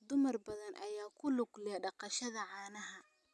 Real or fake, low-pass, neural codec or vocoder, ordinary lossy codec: real; none; none; none